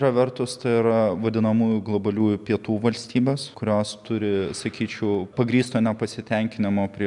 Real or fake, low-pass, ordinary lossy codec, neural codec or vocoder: real; 10.8 kHz; MP3, 96 kbps; none